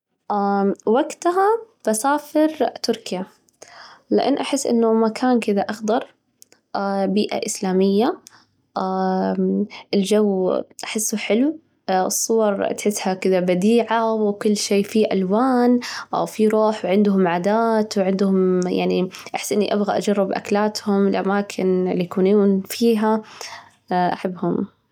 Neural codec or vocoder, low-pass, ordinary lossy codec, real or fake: none; 19.8 kHz; none; real